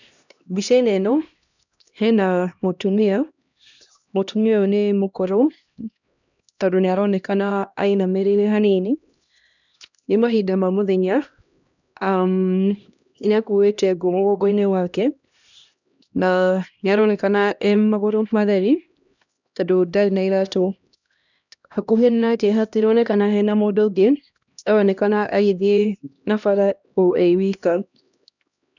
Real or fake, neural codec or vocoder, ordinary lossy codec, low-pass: fake; codec, 16 kHz, 1 kbps, X-Codec, HuBERT features, trained on LibriSpeech; none; 7.2 kHz